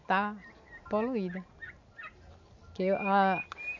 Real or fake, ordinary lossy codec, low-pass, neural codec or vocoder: real; MP3, 64 kbps; 7.2 kHz; none